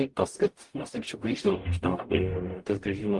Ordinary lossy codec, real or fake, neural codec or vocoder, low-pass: Opus, 24 kbps; fake; codec, 44.1 kHz, 0.9 kbps, DAC; 10.8 kHz